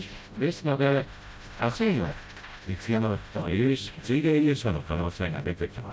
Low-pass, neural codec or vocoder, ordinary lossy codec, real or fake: none; codec, 16 kHz, 0.5 kbps, FreqCodec, smaller model; none; fake